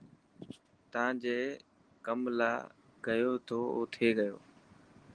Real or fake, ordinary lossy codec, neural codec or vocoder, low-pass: real; Opus, 24 kbps; none; 9.9 kHz